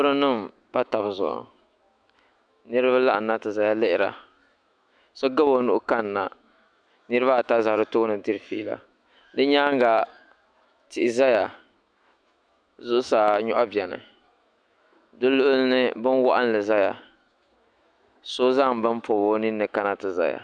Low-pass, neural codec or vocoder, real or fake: 9.9 kHz; autoencoder, 48 kHz, 128 numbers a frame, DAC-VAE, trained on Japanese speech; fake